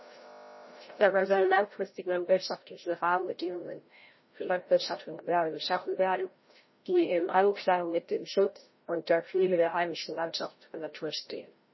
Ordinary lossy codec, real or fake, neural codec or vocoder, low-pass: MP3, 24 kbps; fake; codec, 16 kHz, 0.5 kbps, FreqCodec, larger model; 7.2 kHz